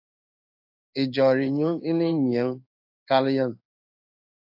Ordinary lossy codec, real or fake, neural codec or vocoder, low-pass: AAC, 48 kbps; fake; codec, 16 kHz in and 24 kHz out, 1 kbps, XY-Tokenizer; 5.4 kHz